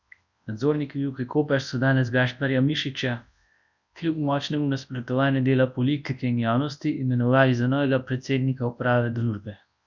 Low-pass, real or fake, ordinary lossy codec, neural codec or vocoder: 7.2 kHz; fake; none; codec, 24 kHz, 0.9 kbps, WavTokenizer, large speech release